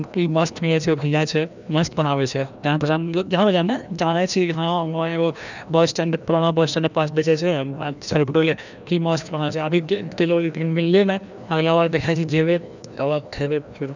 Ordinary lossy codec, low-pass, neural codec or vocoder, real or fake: none; 7.2 kHz; codec, 16 kHz, 1 kbps, FreqCodec, larger model; fake